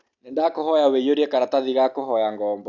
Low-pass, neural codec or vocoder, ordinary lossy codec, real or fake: 7.2 kHz; none; AAC, 48 kbps; real